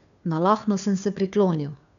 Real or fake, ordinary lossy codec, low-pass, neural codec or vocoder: fake; none; 7.2 kHz; codec, 16 kHz, 2 kbps, FunCodec, trained on Chinese and English, 25 frames a second